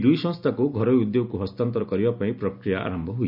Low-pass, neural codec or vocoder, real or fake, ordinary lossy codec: 5.4 kHz; none; real; none